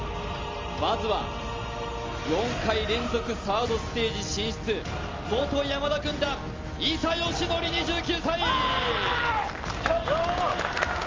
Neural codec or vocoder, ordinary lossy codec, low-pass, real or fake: none; Opus, 32 kbps; 7.2 kHz; real